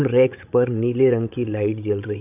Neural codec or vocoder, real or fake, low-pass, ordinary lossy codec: codec, 16 kHz, 16 kbps, FreqCodec, larger model; fake; 3.6 kHz; none